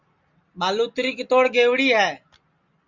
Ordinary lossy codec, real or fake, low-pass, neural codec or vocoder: Opus, 64 kbps; real; 7.2 kHz; none